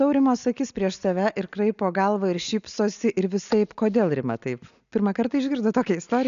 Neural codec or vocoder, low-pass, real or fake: none; 7.2 kHz; real